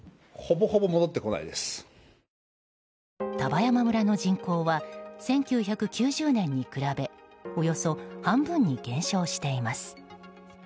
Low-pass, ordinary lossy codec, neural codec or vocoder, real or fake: none; none; none; real